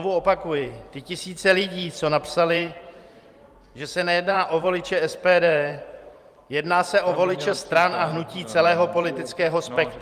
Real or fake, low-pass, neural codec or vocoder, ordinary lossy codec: fake; 14.4 kHz; vocoder, 44.1 kHz, 128 mel bands every 512 samples, BigVGAN v2; Opus, 32 kbps